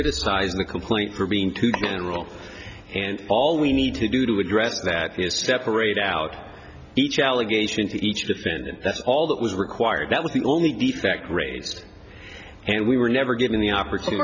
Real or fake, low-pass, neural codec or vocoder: real; 7.2 kHz; none